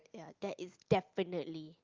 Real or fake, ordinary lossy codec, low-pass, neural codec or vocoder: real; Opus, 24 kbps; 7.2 kHz; none